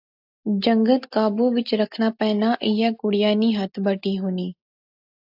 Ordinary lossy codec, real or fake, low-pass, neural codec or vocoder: AAC, 48 kbps; real; 5.4 kHz; none